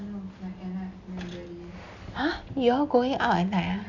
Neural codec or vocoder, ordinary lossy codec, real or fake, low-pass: none; none; real; 7.2 kHz